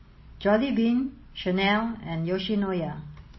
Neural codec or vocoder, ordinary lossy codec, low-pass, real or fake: none; MP3, 24 kbps; 7.2 kHz; real